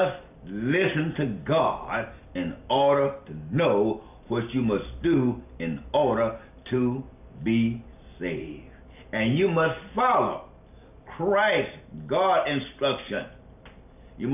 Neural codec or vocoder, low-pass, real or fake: none; 3.6 kHz; real